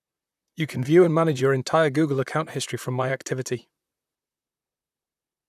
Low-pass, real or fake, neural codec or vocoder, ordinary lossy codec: 14.4 kHz; fake; vocoder, 44.1 kHz, 128 mel bands, Pupu-Vocoder; none